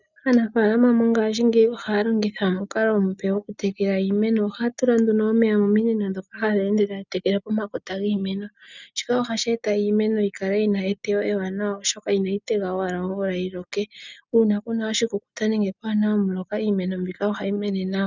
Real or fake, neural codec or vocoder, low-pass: real; none; 7.2 kHz